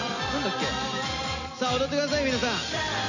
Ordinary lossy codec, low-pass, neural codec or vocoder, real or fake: none; 7.2 kHz; none; real